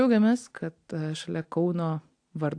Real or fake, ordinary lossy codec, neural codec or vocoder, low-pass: real; AAC, 64 kbps; none; 9.9 kHz